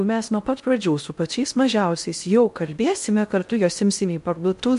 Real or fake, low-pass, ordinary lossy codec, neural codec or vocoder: fake; 10.8 kHz; MP3, 64 kbps; codec, 16 kHz in and 24 kHz out, 0.6 kbps, FocalCodec, streaming, 2048 codes